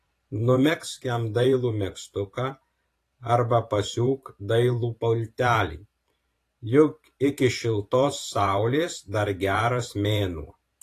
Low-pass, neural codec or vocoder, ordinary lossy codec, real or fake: 14.4 kHz; vocoder, 44.1 kHz, 128 mel bands every 512 samples, BigVGAN v2; AAC, 48 kbps; fake